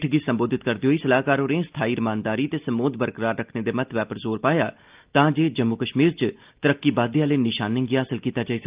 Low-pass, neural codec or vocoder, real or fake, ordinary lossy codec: 3.6 kHz; none; real; Opus, 32 kbps